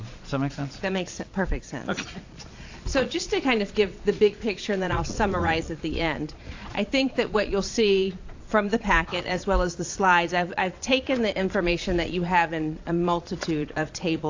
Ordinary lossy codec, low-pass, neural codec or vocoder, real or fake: AAC, 48 kbps; 7.2 kHz; vocoder, 22.05 kHz, 80 mel bands, Vocos; fake